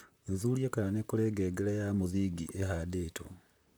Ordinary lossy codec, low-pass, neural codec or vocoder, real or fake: none; none; vocoder, 44.1 kHz, 128 mel bands, Pupu-Vocoder; fake